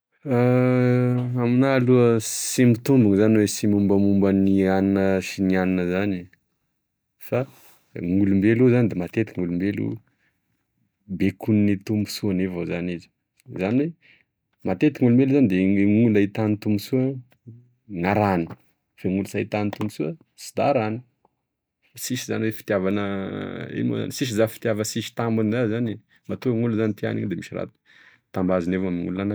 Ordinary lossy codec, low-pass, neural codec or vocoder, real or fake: none; none; none; real